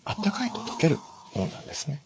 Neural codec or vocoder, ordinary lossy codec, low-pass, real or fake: codec, 16 kHz, 4 kbps, FreqCodec, larger model; none; none; fake